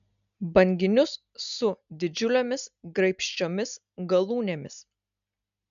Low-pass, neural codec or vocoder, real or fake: 7.2 kHz; none; real